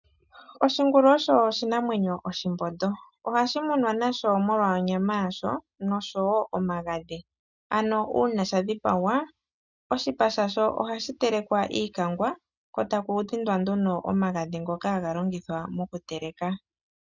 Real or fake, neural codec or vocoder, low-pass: real; none; 7.2 kHz